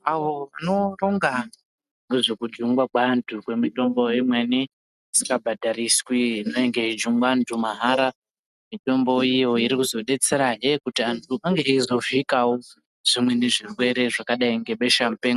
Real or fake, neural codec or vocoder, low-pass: real; none; 14.4 kHz